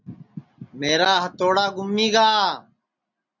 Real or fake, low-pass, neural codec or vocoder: real; 7.2 kHz; none